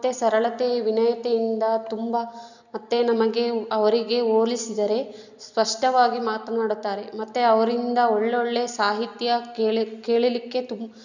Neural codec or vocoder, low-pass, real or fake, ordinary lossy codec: none; 7.2 kHz; real; none